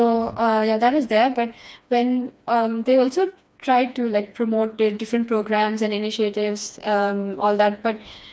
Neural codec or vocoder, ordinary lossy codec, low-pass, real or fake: codec, 16 kHz, 2 kbps, FreqCodec, smaller model; none; none; fake